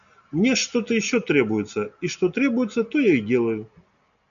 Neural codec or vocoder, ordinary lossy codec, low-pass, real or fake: none; MP3, 96 kbps; 7.2 kHz; real